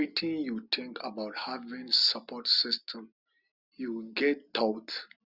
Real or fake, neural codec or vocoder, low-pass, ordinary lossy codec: real; none; 5.4 kHz; Opus, 64 kbps